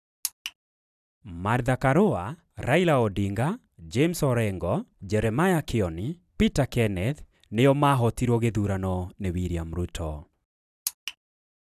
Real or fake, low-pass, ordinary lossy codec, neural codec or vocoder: fake; 14.4 kHz; none; vocoder, 44.1 kHz, 128 mel bands every 256 samples, BigVGAN v2